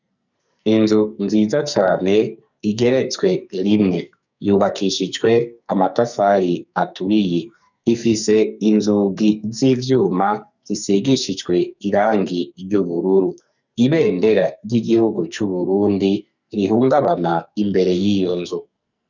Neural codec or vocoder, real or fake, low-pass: codec, 44.1 kHz, 2.6 kbps, SNAC; fake; 7.2 kHz